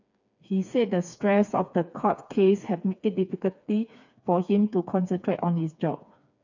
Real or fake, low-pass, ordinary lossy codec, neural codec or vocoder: fake; 7.2 kHz; none; codec, 16 kHz, 4 kbps, FreqCodec, smaller model